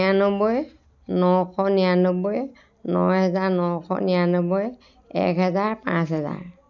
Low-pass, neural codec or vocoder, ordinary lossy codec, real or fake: 7.2 kHz; none; none; real